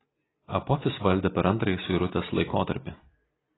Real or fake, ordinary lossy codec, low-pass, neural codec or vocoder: real; AAC, 16 kbps; 7.2 kHz; none